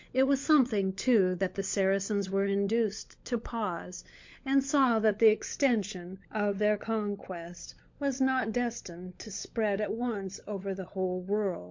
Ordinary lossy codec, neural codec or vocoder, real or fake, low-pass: MP3, 48 kbps; codec, 16 kHz, 16 kbps, FunCodec, trained on LibriTTS, 50 frames a second; fake; 7.2 kHz